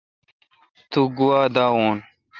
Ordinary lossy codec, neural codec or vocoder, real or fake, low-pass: Opus, 32 kbps; none; real; 7.2 kHz